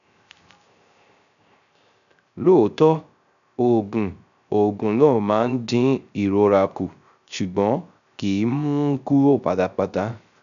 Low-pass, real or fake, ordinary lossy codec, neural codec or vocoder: 7.2 kHz; fake; none; codec, 16 kHz, 0.3 kbps, FocalCodec